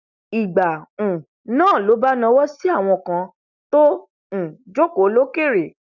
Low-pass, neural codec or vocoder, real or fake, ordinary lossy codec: 7.2 kHz; none; real; none